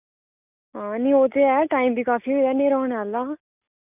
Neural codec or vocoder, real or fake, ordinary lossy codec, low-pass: none; real; none; 3.6 kHz